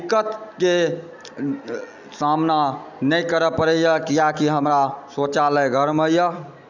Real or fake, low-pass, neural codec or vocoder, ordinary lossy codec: real; 7.2 kHz; none; none